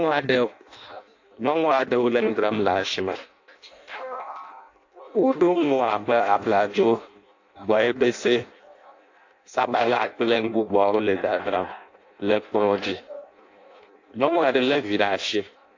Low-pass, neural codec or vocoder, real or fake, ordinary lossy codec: 7.2 kHz; codec, 16 kHz in and 24 kHz out, 0.6 kbps, FireRedTTS-2 codec; fake; AAC, 48 kbps